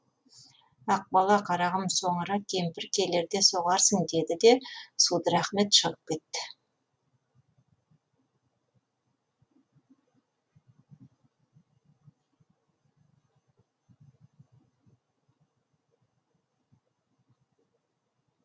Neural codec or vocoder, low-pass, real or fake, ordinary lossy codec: none; none; real; none